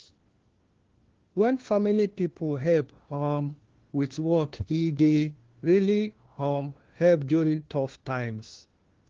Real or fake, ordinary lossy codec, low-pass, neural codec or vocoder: fake; Opus, 16 kbps; 7.2 kHz; codec, 16 kHz, 1 kbps, FunCodec, trained on LibriTTS, 50 frames a second